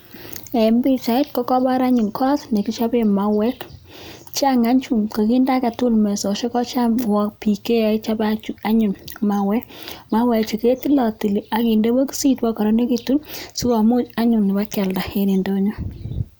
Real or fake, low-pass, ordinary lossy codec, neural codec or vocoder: real; none; none; none